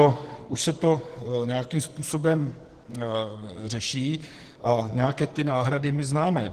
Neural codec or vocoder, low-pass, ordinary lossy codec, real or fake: codec, 44.1 kHz, 2.6 kbps, SNAC; 14.4 kHz; Opus, 16 kbps; fake